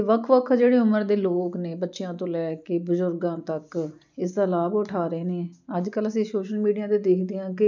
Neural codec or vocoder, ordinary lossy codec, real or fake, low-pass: none; none; real; 7.2 kHz